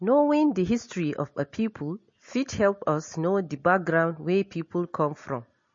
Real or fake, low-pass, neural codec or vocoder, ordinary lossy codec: real; 7.2 kHz; none; MP3, 32 kbps